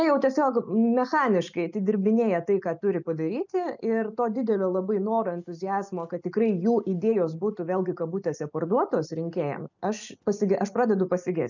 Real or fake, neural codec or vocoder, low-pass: real; none; 7.2 kHz